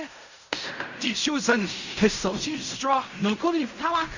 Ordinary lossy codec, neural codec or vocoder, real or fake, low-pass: none; codec, 16 kHz in and 24 kHz out, 0.4 kbps, LongCat-Audio-Codec, fine tuned four codebook decoder; fake; 7.2 kHz